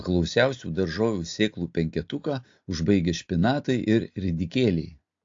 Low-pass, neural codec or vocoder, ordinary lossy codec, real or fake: 7.2 kHz; none; AAC, 48 kbps; real